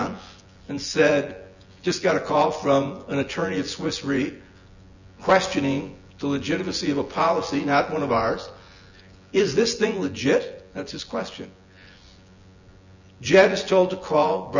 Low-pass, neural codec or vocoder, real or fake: 7.2 kHz; vocoder, 24 kHz, 100 mel bands, Vocos; fake